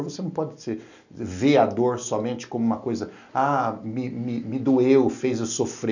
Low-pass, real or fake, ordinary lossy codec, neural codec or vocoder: 7.2 kHz; real; none; none